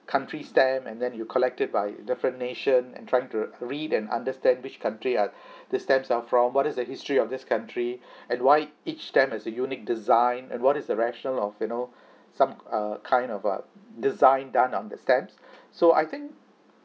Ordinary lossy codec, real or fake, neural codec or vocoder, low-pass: none; real; none; none